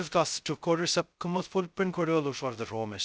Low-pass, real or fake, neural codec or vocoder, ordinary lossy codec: none; fake; codec, 16 kHz, 0.2 kbps, FocalCodec; none